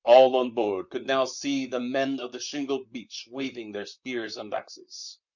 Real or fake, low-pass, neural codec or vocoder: fake; 7.2 kHz; vocoder, 44.1 kHz, 128 mel bands, Pupu-Vocoder